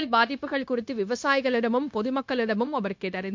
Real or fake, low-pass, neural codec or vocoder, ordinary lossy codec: fake; 7.2 kHz; codec, 16 kHz, 0.9 kbps, LongCat-Audio-Codec; MP3, 48 kbps